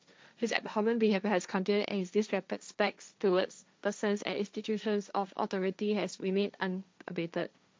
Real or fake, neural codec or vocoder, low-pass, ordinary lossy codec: fake; codec, 16 kHz, 1.1 kbps, Voila-Tokenizer; none; none